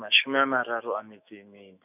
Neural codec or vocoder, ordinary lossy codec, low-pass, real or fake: autoencoder, 48 kHz, 128 numbers a frame, DAC-VAE, trained on Japanese speech; none; 3.6 kHz; fake